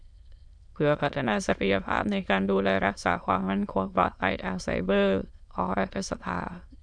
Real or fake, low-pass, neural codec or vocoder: fake; 9.9 kHz; autoencoder, 22.05 kHz, a latent of 192 numbers a frame, VITS, trained on many speakers